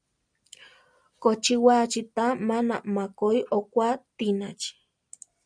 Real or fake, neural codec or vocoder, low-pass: real; none; 9.9 kHz